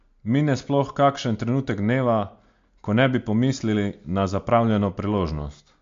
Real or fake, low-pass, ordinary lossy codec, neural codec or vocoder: real; 7.2 kHz; MP3, 48 kbps; none